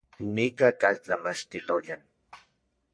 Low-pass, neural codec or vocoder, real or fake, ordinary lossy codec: 9.9 kHz; codec, 44.1 kHz, 1.7 kbps, Pupu-Codec; fake; MP3, 48 kbps